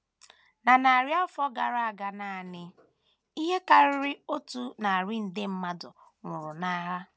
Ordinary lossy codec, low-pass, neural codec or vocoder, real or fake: none; none; none; real